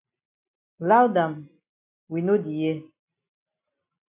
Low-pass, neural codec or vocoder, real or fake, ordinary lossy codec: 3.6 kHz; none; real; AAC, 32 kbps